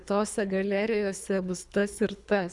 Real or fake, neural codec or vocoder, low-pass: fake; codec, 24 kHz, 3 kbps, HILCodec; 10.8 kHz